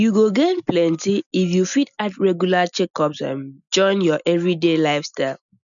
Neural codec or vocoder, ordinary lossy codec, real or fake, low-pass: none; none; real; 7.2 kHz